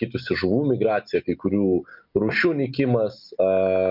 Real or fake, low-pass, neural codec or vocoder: real; 5.4 kHz; none